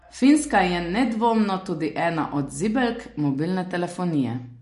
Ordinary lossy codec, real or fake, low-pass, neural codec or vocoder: MP3, 48 kbps; real; 14.4 kHz; none